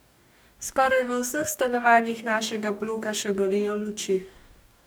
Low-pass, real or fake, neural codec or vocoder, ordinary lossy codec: none; fake; codec, 44.1 kHz, 2.6 kbps, DAC; none